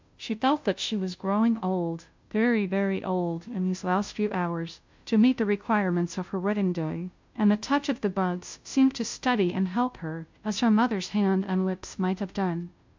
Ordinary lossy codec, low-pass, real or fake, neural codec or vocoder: MP3, 64 kbps; 7.2 kHz; fake; codec, 16 kHz, 0.5 kbps, FunCodec, trained on Chinese and English, 25 frames a second